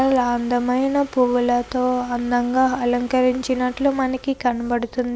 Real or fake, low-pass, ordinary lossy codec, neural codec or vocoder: real; none; none; none